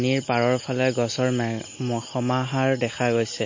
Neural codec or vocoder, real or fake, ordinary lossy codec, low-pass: none; real; MP3, 32 kbps; 7.2 kHz